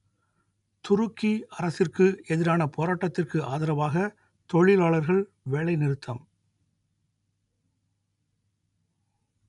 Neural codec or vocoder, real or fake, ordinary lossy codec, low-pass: none; real; MP3, 96 kbps; 10.8 kHz